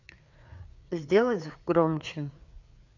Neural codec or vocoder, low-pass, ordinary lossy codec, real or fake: codec, 16 kHz, 4 kbps, FreqCodec, larger model; 7.2 kHz; none; fake